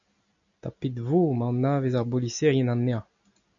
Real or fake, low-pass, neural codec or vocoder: real; 7.2 kHz; none